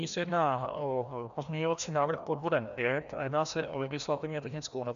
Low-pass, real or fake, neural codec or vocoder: 7.2 kHz; fake; codec, 16 kHz, 1 kbps, FreqCodec, larger model